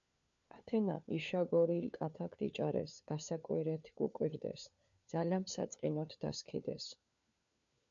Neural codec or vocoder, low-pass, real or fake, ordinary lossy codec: codec, 16 kHz, 4 kbps, FunCodec, trained on LibriTTS, 50 frames a second; 7.2 kHz; fake; MP3, 64 kbps